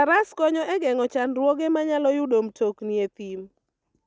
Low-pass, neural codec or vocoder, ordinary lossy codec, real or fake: none; none; none; real